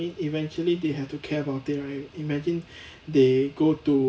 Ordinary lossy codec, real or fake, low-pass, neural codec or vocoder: none; real; none; none